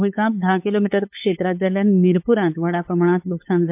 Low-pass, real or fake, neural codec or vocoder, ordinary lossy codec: 3.6 kHz; fake; codec, 16 kHz, 8 kbps, FunCodec, trained on LibriTTS, 25 frames a second; MP3, 32 kbps